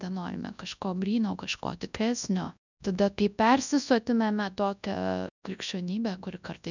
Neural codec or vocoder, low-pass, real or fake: codec, 24 kHz, 0.9 kbps, WavTokenizer, large speech release; 7.2 kHz; fake